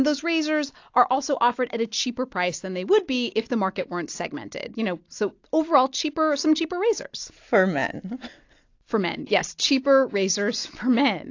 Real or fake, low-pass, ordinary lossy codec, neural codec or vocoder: real; 7.2 kHz; AAC, 48 kbps; none